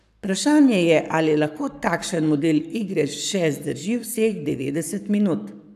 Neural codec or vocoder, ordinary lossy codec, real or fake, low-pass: codec, 44.1 kHz, 7.8 kbps, Pupu-Codec; none; fake; 14.4 kHz